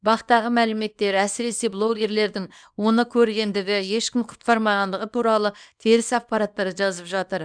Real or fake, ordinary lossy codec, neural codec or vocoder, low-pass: fake; none; codec, 24 kHz, 0.9 kbps, WavTokenizer, small release; 9.9 kHz